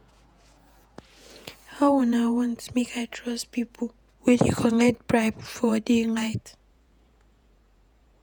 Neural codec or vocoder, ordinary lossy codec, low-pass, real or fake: vocoder, 48 kHz, 128 mel bands, Vocos; none; none; fake